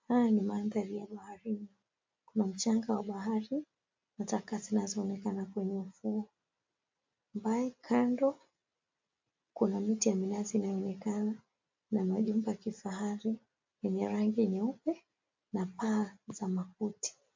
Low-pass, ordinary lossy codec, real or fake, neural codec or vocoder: 7.2 kHz; MP3, 64 kbps; real; none